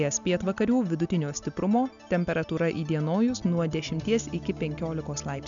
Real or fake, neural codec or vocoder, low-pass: real; none; 7.2 kHz